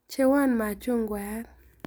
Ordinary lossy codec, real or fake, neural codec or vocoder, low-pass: none; real; none; none